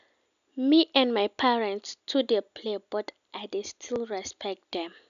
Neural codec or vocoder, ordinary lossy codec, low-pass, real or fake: none; none; 7.2 kHz; real